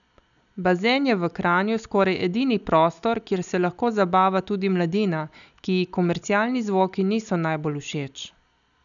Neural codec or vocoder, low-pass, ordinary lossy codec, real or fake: none; 7.2 kHz; none; real